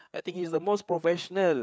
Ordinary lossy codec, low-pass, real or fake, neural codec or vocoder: none; none; fake; codec, 16 kHz, 4 kbps, FreqCodec, larger model